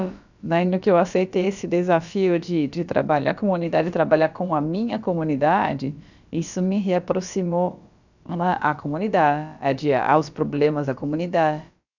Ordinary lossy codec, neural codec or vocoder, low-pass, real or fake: none; codec, 16 kHz, about 1 kbps, DyCAST, with the encoder's durations; 7.2 kHz; fake